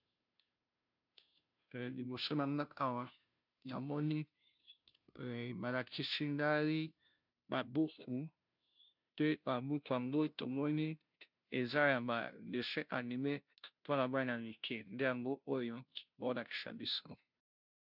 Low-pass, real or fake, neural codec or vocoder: 5.4 kHz; fake; codec, 16 kHz, 0.5 kbps, FunCodec, trained on Chinese and English, 25 frames a second